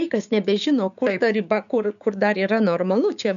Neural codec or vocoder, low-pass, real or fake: codec, 16 kHz, 6 kbps, DAC; 7.2 kHz; fake